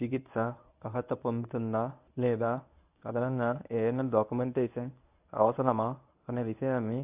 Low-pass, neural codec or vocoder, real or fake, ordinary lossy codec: 3.6 kHz; codec, 24 kHz, 0.9 kbps, WavTokenizer, medium speech release version 1; fake; AAC, 32 kbps